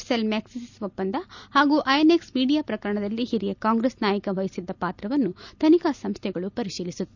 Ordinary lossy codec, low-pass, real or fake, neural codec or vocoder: none; 7.2 kHz; real; none